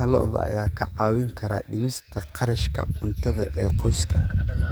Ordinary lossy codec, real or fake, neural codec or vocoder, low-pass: none; fake; codec, 44.1 kHz, 2.6 kbps, SNAC; none